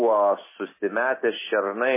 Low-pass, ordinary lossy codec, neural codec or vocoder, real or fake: 3.6 kHz; MP3, 16 kbps; none; real